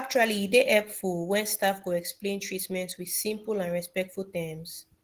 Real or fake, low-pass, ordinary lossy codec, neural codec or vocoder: real; 14.4 kHz; Opus, 16 kbps; none